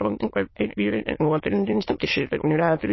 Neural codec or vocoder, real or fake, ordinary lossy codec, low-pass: autoencoder, 22.05 kHz, a latent of 192 numbers a frame, VITS, trained on many speakers; fake; MP3, 24 kbps; 7.2 kHz